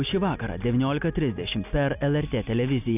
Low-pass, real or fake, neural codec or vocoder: 3.6 kHz; real; none